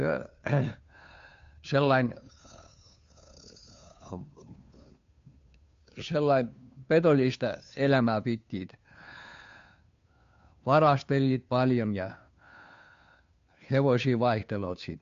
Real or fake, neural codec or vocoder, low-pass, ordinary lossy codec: fake; codec, 16 kHz, 2 kbps, FunCodec, trained on Chinese and English, 25 frames a second; 7.2 kHz; MP3, 48 kbps